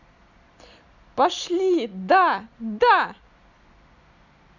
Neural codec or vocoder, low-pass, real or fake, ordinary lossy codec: none; 7.2 kHz; real; none